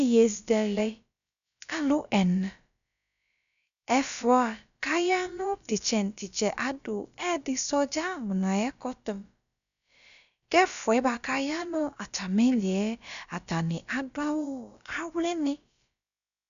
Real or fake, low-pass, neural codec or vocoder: fake; 7.2 kHz; codec, 16 kHz, about 1 kbps, DyCAST, with the encoder's durations